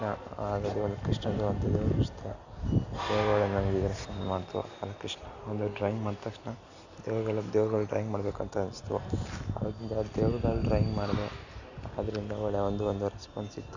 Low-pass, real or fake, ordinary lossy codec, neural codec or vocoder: 7.2 kHz; real; Opus, 64 kbps; none